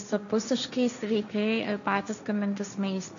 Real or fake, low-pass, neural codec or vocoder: fake; 7.2 kHz; codec, 16 kHz, 1.1 kbps, Voila-Tokenizer